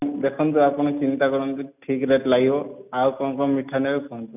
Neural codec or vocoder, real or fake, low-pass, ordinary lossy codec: none; real; 3.6 kHz; none